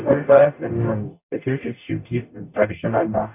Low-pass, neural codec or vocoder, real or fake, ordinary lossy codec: 3.6 kHz; codec, 44.1 kHz, 0.9 kbps, DAC; fake; none